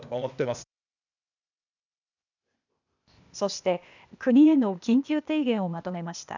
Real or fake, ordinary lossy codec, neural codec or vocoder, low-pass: fake; none; codec, 16 kHz, 0.8 kbps, ZipCodec; 7.2 kHz